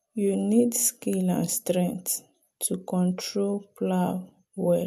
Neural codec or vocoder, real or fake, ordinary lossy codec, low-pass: none; real; MP3, 96 kbps; 14.4 kHz